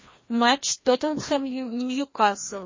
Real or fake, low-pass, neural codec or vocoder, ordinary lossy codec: fake; 7.2 kHz; codec, 16 kHz, 1 kbps, FreqCodec, larger model; MP3, 32 kbps